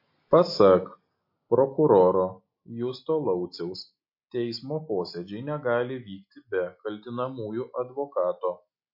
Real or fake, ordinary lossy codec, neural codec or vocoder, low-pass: real; MP3, 32 kbps; none; 5.4 kHz